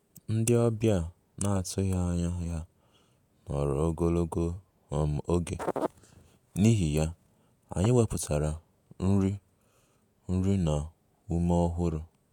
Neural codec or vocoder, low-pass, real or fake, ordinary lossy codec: vocoder, 48 kHz, 128 mel bands, Vocos; 19.8 kHz; fake; none